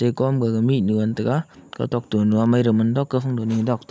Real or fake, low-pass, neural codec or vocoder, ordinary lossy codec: real; none; none; none